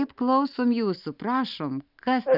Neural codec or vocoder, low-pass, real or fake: none; 5.4 kHz; real